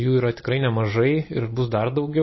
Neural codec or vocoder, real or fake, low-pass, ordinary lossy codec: none; real; 7.2 kHz; MP3, 24 kbps